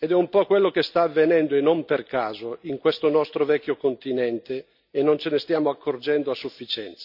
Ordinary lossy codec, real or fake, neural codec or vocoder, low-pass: none; real; none; 5.4 kHz